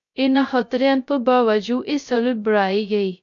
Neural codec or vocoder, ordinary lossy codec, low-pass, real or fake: codec, 16 kHz, 0.2 kbps, FocalCodec; AAC, 64 kbps; 7.2 kHz; fake